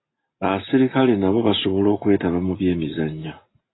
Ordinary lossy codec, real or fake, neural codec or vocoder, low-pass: AAC, 16 kbps; real; none; 7.2 kHz